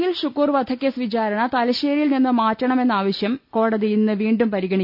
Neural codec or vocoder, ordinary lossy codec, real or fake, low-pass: none; none; real; 5.4 kHz